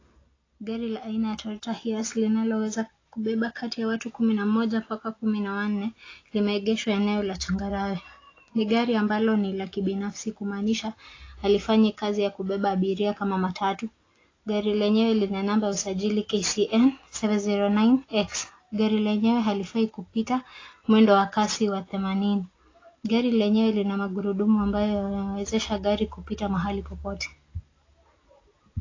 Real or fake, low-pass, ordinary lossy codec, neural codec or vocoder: real; 7.2 kHz; AAC, 32 kbps; none